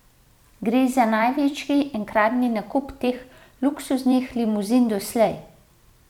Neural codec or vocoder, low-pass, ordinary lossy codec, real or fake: vocoder, 44.1 kHz, 128 mel bands every 256 samples, BigVGAN v2; 19.8 kHz; none; fake